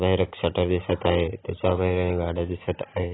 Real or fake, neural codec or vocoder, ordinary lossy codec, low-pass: real; none; AAC, 16 kbps; 7.2 kHz